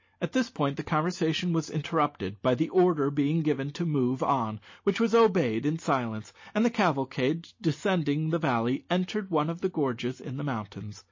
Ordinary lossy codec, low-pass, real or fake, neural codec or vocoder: MP3, 32 kbps; 7.2 kHz; real; none